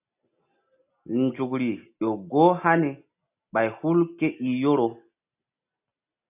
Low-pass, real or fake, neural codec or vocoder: 3.6 kHz; real; none